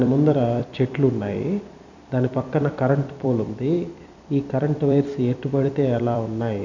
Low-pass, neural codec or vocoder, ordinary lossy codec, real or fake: 7.2 kHz; vocoder, 44.1 kHz, 128 mel bands every 256 samples, BigVGAN v2; none; fake